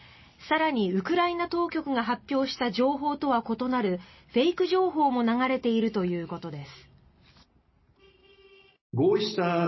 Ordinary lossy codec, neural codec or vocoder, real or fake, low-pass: MP3, 24 kbps; none; real; 7.2 kHz